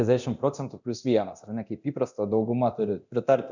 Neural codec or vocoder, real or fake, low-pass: codec, 24 kHz, 0.9 kbps, DualCodec; fake; 7.2 kHz